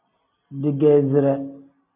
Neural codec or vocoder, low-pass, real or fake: none; 3.6 kHz; real